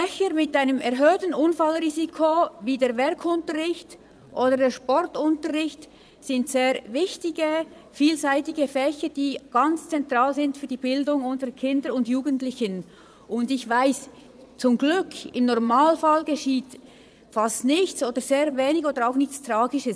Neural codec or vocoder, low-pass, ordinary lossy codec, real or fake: vocoder, 22.05 kHz, 80 mel bands, Vocos; none; none; fake